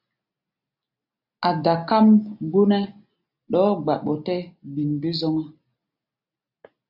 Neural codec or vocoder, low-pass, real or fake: none; 5.4 kHz; real